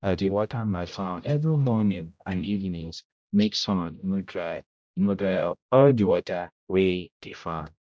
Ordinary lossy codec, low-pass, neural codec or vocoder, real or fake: none; none; codec, 16 kHz, 0.5 kbps, X-Codec, HuBERT features, trained on general audio; fake